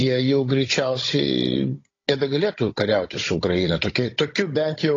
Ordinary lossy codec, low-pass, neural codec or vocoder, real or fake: AAC, 32 kbps; 7.2 kHz; codec, 16 kHz, 16 kbps, FunCodec, trained on Chinese and English, 50 frames a second; fake